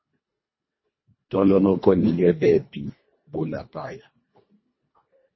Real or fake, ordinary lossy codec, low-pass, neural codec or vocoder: fake; MP3, 24 kbps; 7.2 kHz; codec, 24 kHz, 1.5 kbps, HILCodec